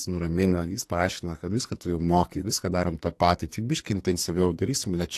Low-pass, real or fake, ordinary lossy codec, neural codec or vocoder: 14.4 kHz; fake; AAC, 64 kbps; codec, 44.1 kHz, 2.6 kbps, SNAC